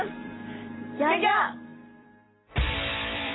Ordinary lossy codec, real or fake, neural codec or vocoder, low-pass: AAC, 16 kbps; fake; vocoder, 44.1 kHz, 128 mel bands every 256 samples, BigVGAN v2; 7.2 kHz